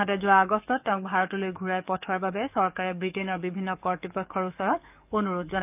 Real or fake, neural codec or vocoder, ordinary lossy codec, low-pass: fake; codec, 44.1 kHz, 7.8 kbps, DAC; none; 3.6 kHz